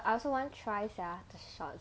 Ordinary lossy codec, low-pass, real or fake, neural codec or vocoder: none; none; real; none